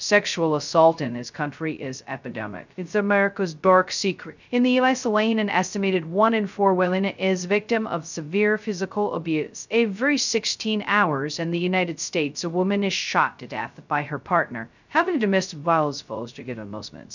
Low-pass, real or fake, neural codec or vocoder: 7.2 kHz; fake; codec, 16 kHz, 0.2 kbps, FocalCodec